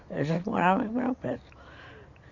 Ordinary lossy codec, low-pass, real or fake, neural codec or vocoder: Opus, 64 kbps; 7.2 kHz; real; none